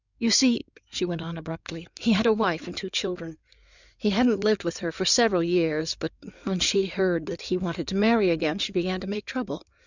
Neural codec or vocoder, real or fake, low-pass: codec, 16 kHz in and 24 kHz out, 2.2 kbps, FireRedTTS-2 codec; fake; 7.2 kHz